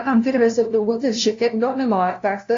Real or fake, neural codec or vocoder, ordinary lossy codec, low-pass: fake; codec, 16 kHz, 0.5 kbps, FunCodec, trained on LibriTTS, 25 frames a second; AAC, 48 kbps; 7.2 kHz